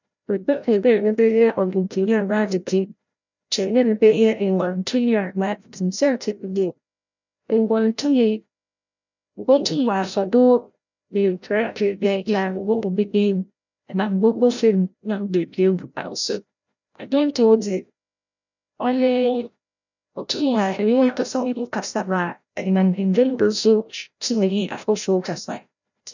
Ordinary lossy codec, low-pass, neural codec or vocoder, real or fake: none; 7.2 kHz; codec, 16 kHz, 0.5 kbps, FreqCodec, larger model; fake